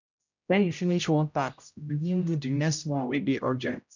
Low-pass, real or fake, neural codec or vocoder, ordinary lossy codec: 7.2 kHz; fake; codec, 16 kHz, 0.5 kbps, X-Codec, HuBERT features, trained on general audio; none